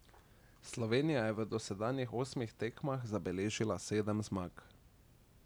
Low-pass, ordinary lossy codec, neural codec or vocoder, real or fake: none; none; none; real